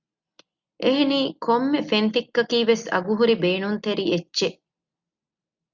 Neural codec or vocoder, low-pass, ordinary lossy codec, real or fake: none; 7.2 kHz; Opus, 64 kbps; real